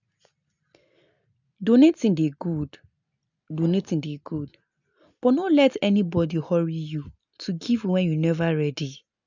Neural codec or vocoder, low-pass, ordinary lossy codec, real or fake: none; 7.2 kHz; none; real